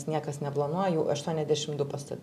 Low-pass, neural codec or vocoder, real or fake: 14.4 kHz; none; real